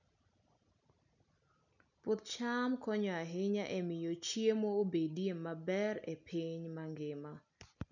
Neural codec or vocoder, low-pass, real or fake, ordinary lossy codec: none; 7.2 kHz; real; none